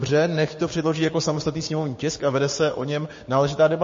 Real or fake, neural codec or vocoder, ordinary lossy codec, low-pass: fake; codec, 16 kHz, 6 kbps, DAC; MP3, 32 kbps; 7.2 kHz